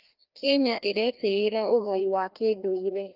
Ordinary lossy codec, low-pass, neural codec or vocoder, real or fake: Opus, 24 kbps; 5.4 kHz; codec, 16 kHz, 1 kbps, FreqCodec, larger model; fake